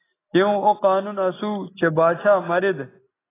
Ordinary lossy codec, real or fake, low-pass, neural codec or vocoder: AAC, 16 kbps; real; 3.6 kHz; none